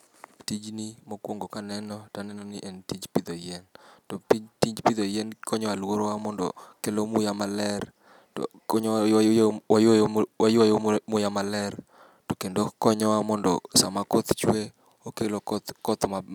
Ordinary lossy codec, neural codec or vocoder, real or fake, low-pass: none; none; real; 19.8 kHz